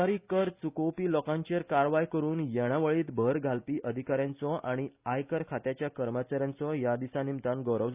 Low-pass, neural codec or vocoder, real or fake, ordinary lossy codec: 3.6 kHz; none; real; Opus, 64 kbps